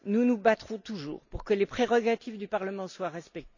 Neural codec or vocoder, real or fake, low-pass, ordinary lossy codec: none; real; 7.2 kHz; none